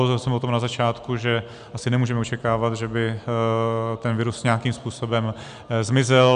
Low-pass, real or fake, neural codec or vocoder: 9.9 kHz; real; none